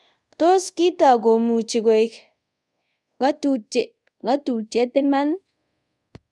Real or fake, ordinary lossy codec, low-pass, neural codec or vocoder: fake; none; none; codec, 24 kHz, 0.5 kbps, DualCodec